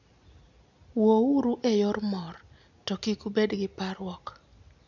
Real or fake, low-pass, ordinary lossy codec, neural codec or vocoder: real; 7.2 kHz; none; none